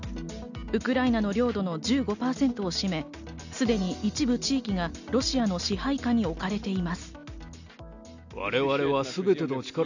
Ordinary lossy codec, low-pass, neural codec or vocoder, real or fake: none; 7.2 kHz; none; real